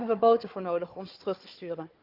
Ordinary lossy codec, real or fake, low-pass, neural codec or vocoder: Opus, 24 kbps; fake; 5.4 kHz; codec, 16 kHz, 4 kbps, FunCodec, trained on Chinese and English, 50 frames a second